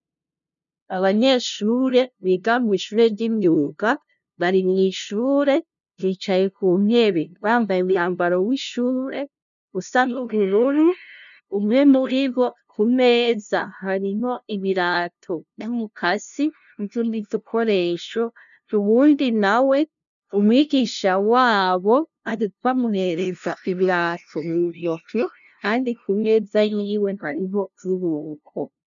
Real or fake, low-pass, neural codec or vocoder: fake; 7.2 kHz; codec, 16 kHz, 0.5 kbps, FunCodec, trained on LibriTTS, 25 frames a second